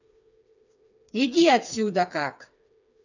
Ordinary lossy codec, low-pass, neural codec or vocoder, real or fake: none; 7.2 kHz; codec, 16 kHz, 4 kbps, FreqCodec, smaller model; fake